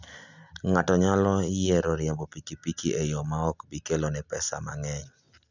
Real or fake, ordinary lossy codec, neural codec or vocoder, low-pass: real; none; none; 7.2 kHz